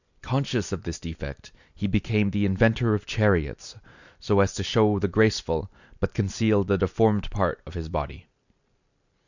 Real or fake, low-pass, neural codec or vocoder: real; 7.2 kHz; none